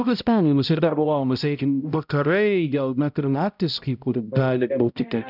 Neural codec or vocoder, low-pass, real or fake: codec, 16 kHz, 0.5 kbps, X-Codec, HuBERT features, trained on balanced general audio; 5.4 kHz; fake